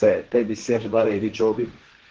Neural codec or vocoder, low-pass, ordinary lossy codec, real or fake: codec, 16 kHz, 1.1 kbps, Voila-Tokenizer; 7.2 kHz; Opus, 16 kbps; fake